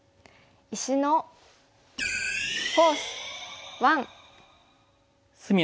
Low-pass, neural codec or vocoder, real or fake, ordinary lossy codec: none; none; real; none